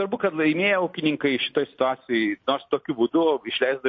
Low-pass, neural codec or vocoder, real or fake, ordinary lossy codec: 7.2 kHz; none; real; MP3, 32 kbps